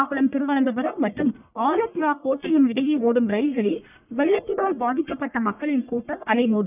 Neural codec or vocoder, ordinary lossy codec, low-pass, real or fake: codec, 44.1 kHz, 1.7 kbps, Pupu-Codec; none; 3.6 kHz; fake